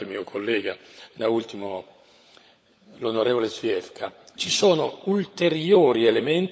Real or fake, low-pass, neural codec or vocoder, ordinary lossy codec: fake; none; codec, 16 kHz, 16 kbps, FunCodec, trained on LibriTTS, 50 frames a second; none